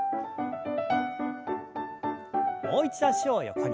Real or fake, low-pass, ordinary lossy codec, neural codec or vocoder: real; none; none; none